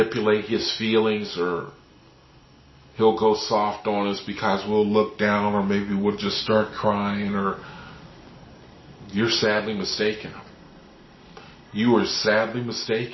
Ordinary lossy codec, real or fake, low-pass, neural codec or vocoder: MP3, 24 kbps; real; 7.2 kHz; none